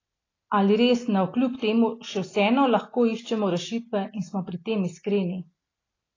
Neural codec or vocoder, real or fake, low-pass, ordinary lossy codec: none; real; 7.2 kHz; AAC, 32 kbps